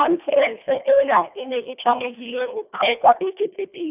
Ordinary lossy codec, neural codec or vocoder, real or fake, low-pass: none; codec, 24 kHz, 1.5 kbps, HILCodec; fake; 3.6 kHz